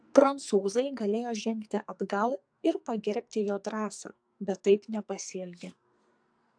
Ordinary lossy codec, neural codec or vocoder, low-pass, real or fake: AAC, 64 kbps; codec, 44.1 kHz, 2.6 kbps, SNAC; 9.9 kHz; fake